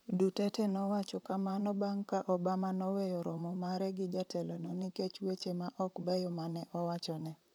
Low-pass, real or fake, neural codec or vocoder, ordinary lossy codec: none; fake; vocoder, 44.1 kHz, 128 mel bands, Pupu-Vocoder; none